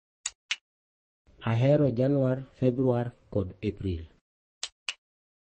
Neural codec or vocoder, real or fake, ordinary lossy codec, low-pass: codec, 44.1 kHz, 2.6 kbps, SNAC; fake; MP3, 32 kbps; 10.8 kHz